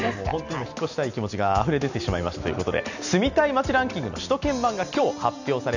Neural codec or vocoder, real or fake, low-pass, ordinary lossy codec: none; real; 7.2 kHz; none